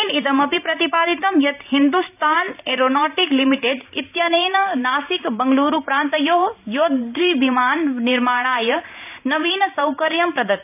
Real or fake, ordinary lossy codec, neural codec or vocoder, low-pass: fake; none; vocoder, 44.1 kHz, 128 mel bands every 512 samples, BigVGAN v2; 3.6 kHz